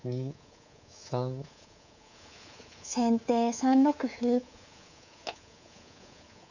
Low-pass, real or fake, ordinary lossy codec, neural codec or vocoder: 7.2 kHz; fake; none; codec, 24 kHz, 3.1 kbps, DualCodec